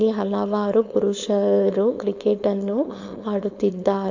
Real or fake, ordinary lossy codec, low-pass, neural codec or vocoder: fake; AAC, 48 kbps; 7.2 kHz; codec, 16 kHz, 4.8 kbps, FACodec